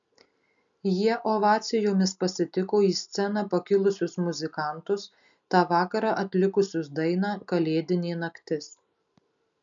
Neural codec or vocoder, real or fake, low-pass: none; real; 7.2 kHz